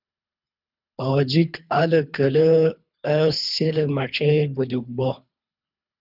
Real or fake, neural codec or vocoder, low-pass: fake; codec, 24 kHz, 3 kbps, HILCodec; 5.4 kHz